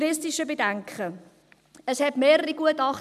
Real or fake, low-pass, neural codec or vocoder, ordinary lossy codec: real; 14.4 kHz; none; none